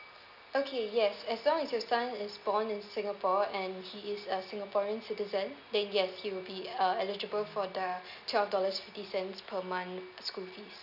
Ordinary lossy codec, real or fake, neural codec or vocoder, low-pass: none; real; none; 5.4 kHz